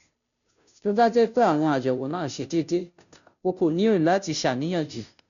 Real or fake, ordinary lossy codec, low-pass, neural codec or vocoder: fake; none; 7.2 kHz; codec, 16 kHz, 0.5 kbps, FunCodec, trained on Chinese and English, 25 frames a second